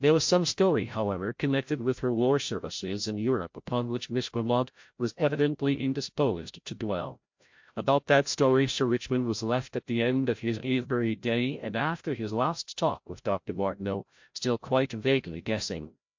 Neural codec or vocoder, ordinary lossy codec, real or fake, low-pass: codec, 16 kHz, 0.5 kbps, FreqCodec, larger model; MP3, 48 kbps; fake; 7.2 kHz